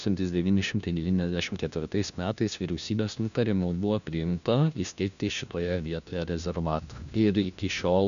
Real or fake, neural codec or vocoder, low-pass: fake; codec, 16 kHz, 1 kbps, FunCodec, trained on LibriTTS, 50 frames a second; 7.2 kHz